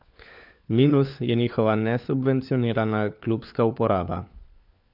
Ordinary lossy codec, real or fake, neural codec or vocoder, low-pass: none; fake; codec, 16 kHz, 4 kbps, FunCodec, trained on LibriTTS, 50 frames a second; 5.4 kHz